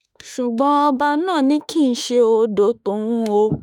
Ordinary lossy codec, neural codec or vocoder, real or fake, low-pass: none; autoencoder, 48 kHz, 32 numbers a frame, DAC-VAE, trained on Japanese speech; fake; 19.8 kHz